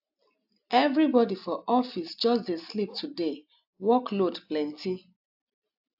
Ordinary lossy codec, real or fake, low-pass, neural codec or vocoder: AAC, 48 kbps; real; 5.4 kHz; none